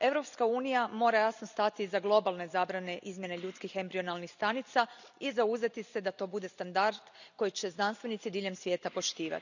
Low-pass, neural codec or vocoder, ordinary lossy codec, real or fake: 7.2 kHz; none; none; real